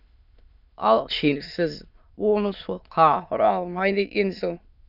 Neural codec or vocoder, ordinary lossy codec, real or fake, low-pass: autoencoder, 22.05 kHz, a latent of 192 numbers a frame, VITS, trained on many speakers; none; fake; 5.4 kHz